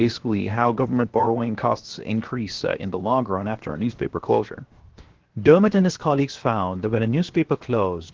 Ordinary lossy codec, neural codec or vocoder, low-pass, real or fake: Opus, 16 kbps; codec, 16 kHz, 0.7 kbps, FocalCodec; 7.2 kHz; fake